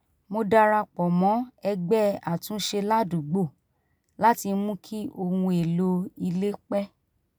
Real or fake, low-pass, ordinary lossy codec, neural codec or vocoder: real; none; none; none